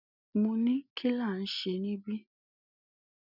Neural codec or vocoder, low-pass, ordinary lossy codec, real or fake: none; 5.4 kHz; none; real